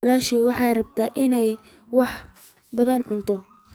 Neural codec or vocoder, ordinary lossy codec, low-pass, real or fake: codec, 44.1 kHz, 2.6 kbps, SNAC; none; none; fake